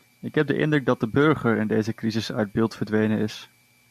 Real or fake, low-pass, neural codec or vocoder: real; 14.4 kHz; none